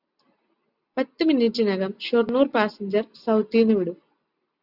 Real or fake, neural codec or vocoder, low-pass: real; none; 5.4 kHz